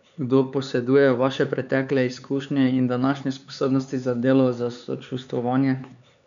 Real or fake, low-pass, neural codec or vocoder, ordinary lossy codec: fake; 7.2 kHz; codec, 16 kHz, 4 kbps, X-Codec, HuBERT features, trained on LibriSpeech; none